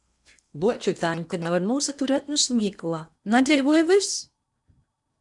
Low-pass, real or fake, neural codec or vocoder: 10.8 kHz; fake; codec, 16 kHz in and 24 kHz out, 0.8 kbps, FocalCodec, streaming, 65536 codes